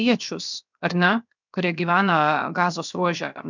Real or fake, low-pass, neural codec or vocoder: fake; 7.2 kHz; codec, 16 kHz, 0.7 kbps, FocalCodec